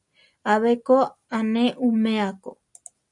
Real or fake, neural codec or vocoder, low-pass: real; none; 10.8 kHz